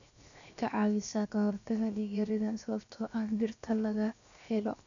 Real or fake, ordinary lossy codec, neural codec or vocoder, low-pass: fake; none; codec, 16 kHz, 0.7 kbps, FocalCodec; 7.2 kHz